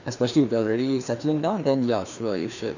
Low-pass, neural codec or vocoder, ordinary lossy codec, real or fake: 7.2 kHz; codec, 16 kHz, 2 kbps, FreqCodec, larger model; none; fake